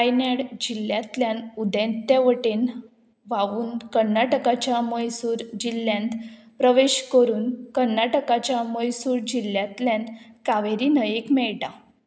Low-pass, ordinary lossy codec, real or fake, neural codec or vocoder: none; none; real; none